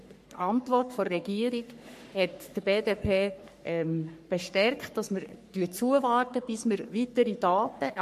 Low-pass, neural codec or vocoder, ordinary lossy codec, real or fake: 14.4 kHz; codec, 44.1 kHz, 3.4 kbps, Pupu-Codec; MP3, 64 kbps; fake